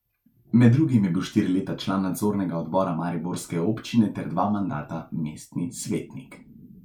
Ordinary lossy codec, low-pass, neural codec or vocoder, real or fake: none; 19.8 kHz; none; real